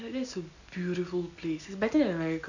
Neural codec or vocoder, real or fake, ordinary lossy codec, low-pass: none; real; none; 7.2 kHz